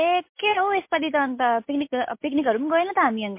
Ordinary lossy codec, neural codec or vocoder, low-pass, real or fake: MP3, 24 kbps; none; 3.6 kHz; real